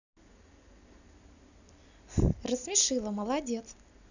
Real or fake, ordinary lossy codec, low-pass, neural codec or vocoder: real; none; 7.2 kHz; none